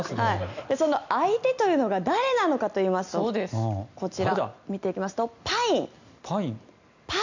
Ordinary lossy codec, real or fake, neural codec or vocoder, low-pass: none; real; none; 7.2 kHz